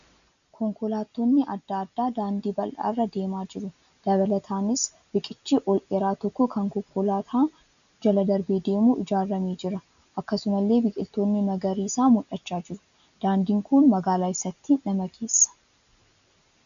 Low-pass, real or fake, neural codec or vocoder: 7.2 kHz; real; none